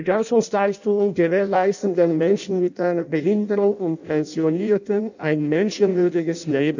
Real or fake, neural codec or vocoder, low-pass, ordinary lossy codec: fake; codec, 16 kHz in and 24 kHz out, 0.6 kbps, FireRedTTS-2 codec; 7.2 kHz; none